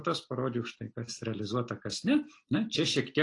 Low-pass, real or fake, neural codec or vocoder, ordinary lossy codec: 10.8 kHz; real; none; AAC, 48 kbps